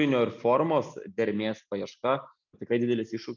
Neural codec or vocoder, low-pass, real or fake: none; 7.2 kHz; real